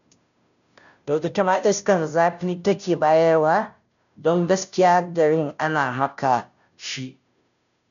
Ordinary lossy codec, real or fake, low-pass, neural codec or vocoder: none; fake; 7.2 kHz; codec, 16 kHz, 0.5 kbps, FunCodec, trained on Chinese and English, 25 frames a second